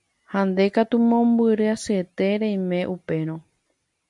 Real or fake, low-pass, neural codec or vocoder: real; 10.8 kHz; none